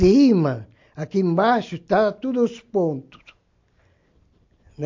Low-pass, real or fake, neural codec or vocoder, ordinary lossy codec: 7.2 kHz; real; none; MP3, 48 kbps